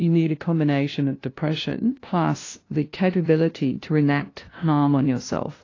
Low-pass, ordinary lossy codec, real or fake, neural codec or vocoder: 7.2 kHz; AAC, 32 kbps; fake; codec, 16 kHz, 0.5 kbps, FunCodec, trained on LibriTTS, 25 frames a second